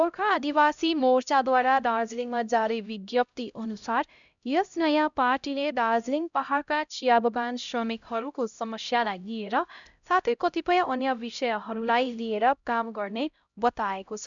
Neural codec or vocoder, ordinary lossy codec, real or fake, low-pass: codec, 16 kHz, 0.5 kbps, X-Codec, HuBERT features, trained on LibriSpeech; none; fake; 7.2 kHz